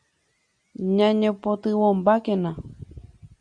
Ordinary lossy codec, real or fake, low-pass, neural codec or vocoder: Opus, 64 kbps; real; 9.9 kHz; none